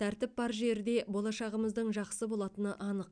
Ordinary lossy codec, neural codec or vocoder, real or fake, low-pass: none; none; real; 9.9 kHz